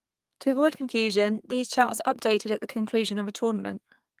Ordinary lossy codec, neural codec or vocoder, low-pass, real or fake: Opus, 32 kbps; codec, 32 kHz, 1.9 kbps, SNAC; 14.4 kHz; fake